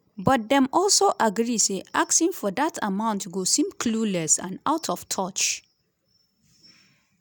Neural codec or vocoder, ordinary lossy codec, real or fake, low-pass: none; none; real; none